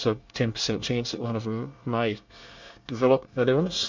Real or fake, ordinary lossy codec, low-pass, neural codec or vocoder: fake; MP3, 64 kbps; 7.2 kHz; codec, 24 kHz, 1 kbps, SNAC